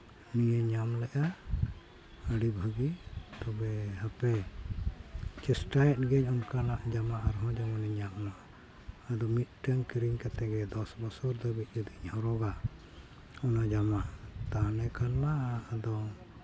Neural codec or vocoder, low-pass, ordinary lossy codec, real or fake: none; none; none; real